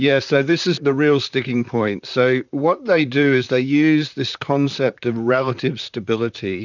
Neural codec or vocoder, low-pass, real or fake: vocoder, 44.1 kHz, 128 mel bands, Pupu-Vocoder; 7.2 kHz; fake